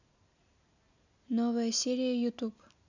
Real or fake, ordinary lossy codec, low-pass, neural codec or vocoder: real; none; 7.2 kHz; none